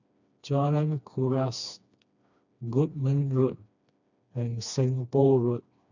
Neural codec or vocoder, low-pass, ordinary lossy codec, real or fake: codec, 16 kHz, 2 kbps, FreqCodec, smaller model; 7.2 kHz; none; fake